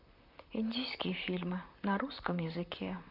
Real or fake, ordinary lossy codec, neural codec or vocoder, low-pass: fake; none; vocoder, 44.1 kHz, 128 mel bands, Pupu-Vocoder; 5.4 kHz